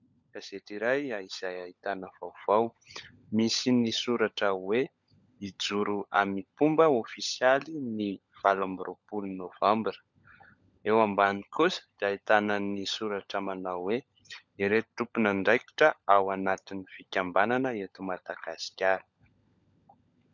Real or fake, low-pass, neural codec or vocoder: fake; 7.2 kHz; codec, 16 kHz, 16 kbps, FunCodec, trained on LibriTTS, 50 frames a second